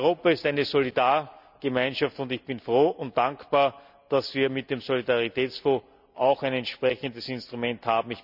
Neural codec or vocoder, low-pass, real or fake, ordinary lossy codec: none; 5.4 kHz; real; none